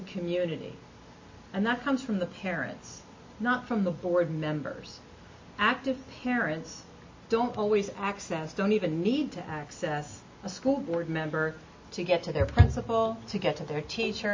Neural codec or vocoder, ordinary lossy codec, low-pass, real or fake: none; MP3, 32 kbps; 7.2 kHz; real